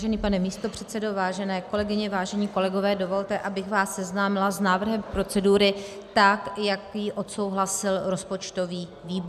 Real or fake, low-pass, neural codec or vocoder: real; 14.4 kHz; none